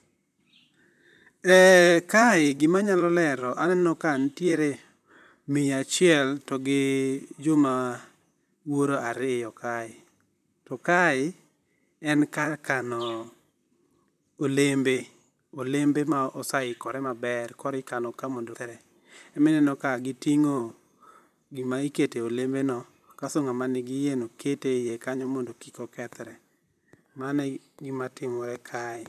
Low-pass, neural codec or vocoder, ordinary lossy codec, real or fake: 14.4 kHz; vocoder, 44.1 kHz, 128 mel bands, Pupu-Vocoder; none; fake